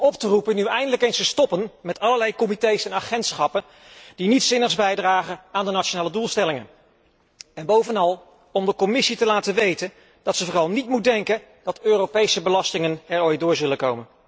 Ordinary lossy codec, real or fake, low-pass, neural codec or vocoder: none; real; none; none